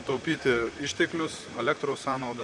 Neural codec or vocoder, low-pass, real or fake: vocoder, 44.1 kHz, 128 mel bands, Pupu-Vocoder; 10.8 kHz; fake